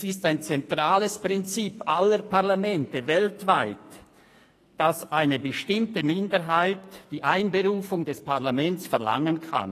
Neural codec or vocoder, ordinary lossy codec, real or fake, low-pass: codec, 44.1 kHz, 2.6 kbps, SNAC; AAC, 48 kbps; fake; 14.4 kHz